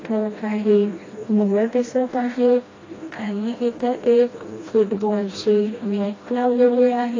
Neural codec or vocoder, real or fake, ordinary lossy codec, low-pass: codec, 16 kHz, 1 kbps, FreqCodec, smaller model; fake; AAC, 32 kbps; 7.2 kHz